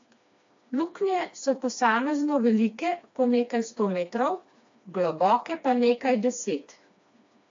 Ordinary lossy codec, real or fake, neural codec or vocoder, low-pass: AAC, 48 kbps; fake; codec, 16 kHz, 2 kbps, FreqCodec, smaller model; 7.2 kHz